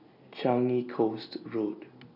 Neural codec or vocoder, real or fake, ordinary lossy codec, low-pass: none; real; none; 5.4 kHz